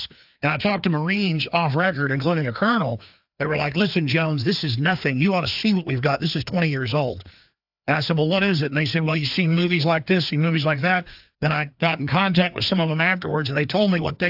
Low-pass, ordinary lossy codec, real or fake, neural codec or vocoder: 5.4 kHz; AAC, 48 kbps; fake; codec, 16 kHz, 2 kbps, FreqCodec, larger model